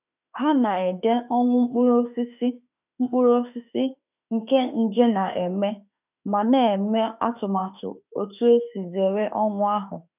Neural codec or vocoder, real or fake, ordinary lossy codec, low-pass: autoencoder, 48 kHz, 32 numbers a frame, DAC-VAE, trained on Japanese speech; fake; none; 3.6 kHz